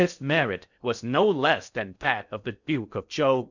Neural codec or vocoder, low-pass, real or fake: codec, 16 kHz in and 24 kHz out, 0.6 kbps, FocalCodec, streaming, 4096 codes; 7.2 kHz; fake